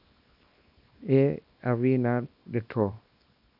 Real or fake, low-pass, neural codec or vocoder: fake; 5.4 kHz; codec, 24 kHz, 0.9 kbps, WavTokenizer, small release